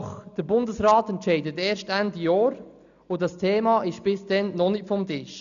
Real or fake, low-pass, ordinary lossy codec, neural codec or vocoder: real; 7.2 kHz; none; none